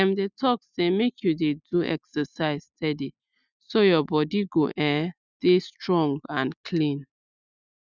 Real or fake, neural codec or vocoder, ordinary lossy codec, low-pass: real; none; none; 7.2 kHz